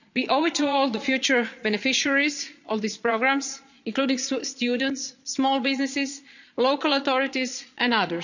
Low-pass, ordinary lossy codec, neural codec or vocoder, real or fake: 7.2 kHz; none; vocoder, 22.05 kHz, 80 mel bands, Vocos; fake